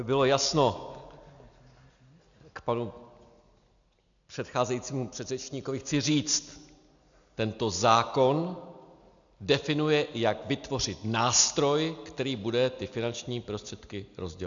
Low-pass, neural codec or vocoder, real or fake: 7.2 kHz; none; real